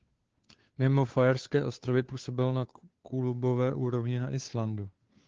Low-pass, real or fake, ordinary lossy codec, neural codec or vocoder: 7.2 kHz; fake; Opus, 16 kbps; codec, 16 kHz, 2 kbps, FunCodec, trained on Chinese and English, 25 frames a second